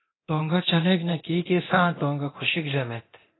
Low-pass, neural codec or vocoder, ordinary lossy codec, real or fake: 7.2 kHz; codec, 24 kHz, 0.9 kbps, DualCodec; AAC, 16 kbps; fake